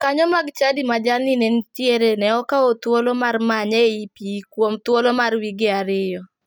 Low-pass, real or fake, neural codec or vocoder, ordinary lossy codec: none; real; none; none